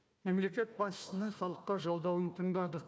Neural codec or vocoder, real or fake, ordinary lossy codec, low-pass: codec, 16 kHz, 1 kbps, FunCodec, trained on Chinese and English, 50 frames a second; fake; none; none